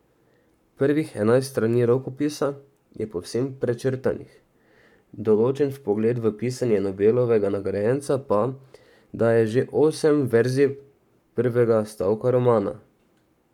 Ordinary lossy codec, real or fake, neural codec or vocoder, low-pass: none; fake; codec, 44.1 kHz, 7.8 kbps, Pupu-Codec; 19.8 kHz